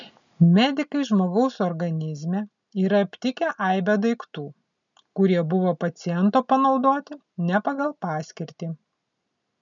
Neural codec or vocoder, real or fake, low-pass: none; real; 7.2 kHz